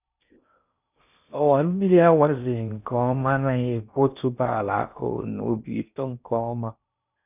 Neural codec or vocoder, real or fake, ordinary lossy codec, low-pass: codec, 16 kHz in and 24 kHz out, 0.6 kbps, FocalCodec, streaming, 4096 codes; fake; none; 3.6 kHz